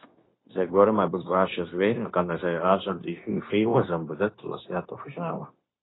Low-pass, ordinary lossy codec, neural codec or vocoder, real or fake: 7.2 kHz; AAC, 16 kbps; codec, 16 kHz, 0.9 kbps, LongCat-Audio-Codec; fake